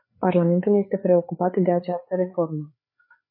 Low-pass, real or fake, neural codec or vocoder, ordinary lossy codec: 5.4 kHz; fake; codec, 16 kHz, 4 kbps, FreqCodec, larger model; MP3, 24 kbps